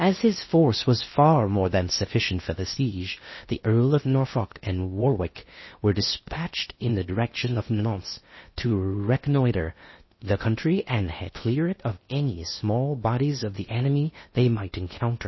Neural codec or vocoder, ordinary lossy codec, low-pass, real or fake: codec, 16 kHz in and 24 kHz out, 0.6 kbps, FocalCodec, streaming, 4096 codes; MP3, 24 kbps; 7.2 kHz; fake